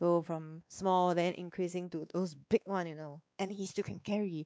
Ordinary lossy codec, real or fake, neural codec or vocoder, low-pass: none; fake; codec, 16 kHz, 2 kbps, X-Codec, WavLM features, trained on Multilingual LibriSpeech; none